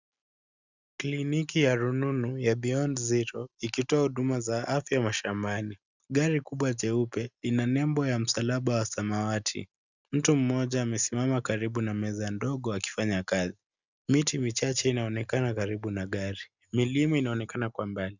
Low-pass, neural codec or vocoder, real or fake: 7.2 kHz; none; real